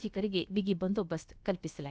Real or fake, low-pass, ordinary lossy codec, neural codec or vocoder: fake; none; none; codec, 16 kHz, about 1 kbps, DyCAST, with the encoder's durations